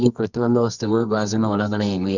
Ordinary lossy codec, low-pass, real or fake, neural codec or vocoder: none; 7.2 kHz; fake; codec, 24 kHz, 0.9 kbps, WavTokenizer, medium music audio release